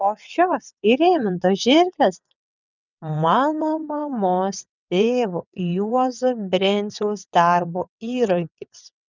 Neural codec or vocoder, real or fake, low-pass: vocoder, 22.05 kHz, 80 mel bands, WaveNeXt; fake; 7.2 kHz